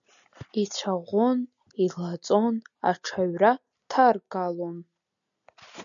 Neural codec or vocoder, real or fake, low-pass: none; real; 7.2 kHz